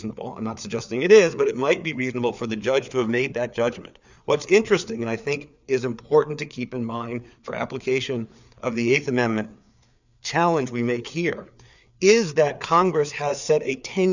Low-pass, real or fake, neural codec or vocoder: 7.2 kHz; fake; codec, 16 kHz, 4 kbps, FreqCodec, larger model